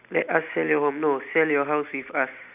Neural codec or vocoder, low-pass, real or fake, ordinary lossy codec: none; 3.6 kHz; real; none